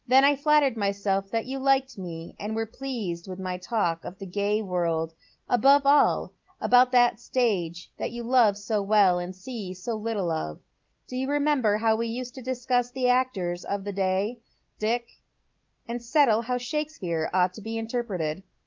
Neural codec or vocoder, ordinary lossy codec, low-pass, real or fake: none; Opus, 32 kbps; 7.2 kHz; real